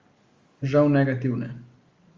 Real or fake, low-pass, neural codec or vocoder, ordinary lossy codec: real; 7.2 kHz; none; Opus, 32 kbps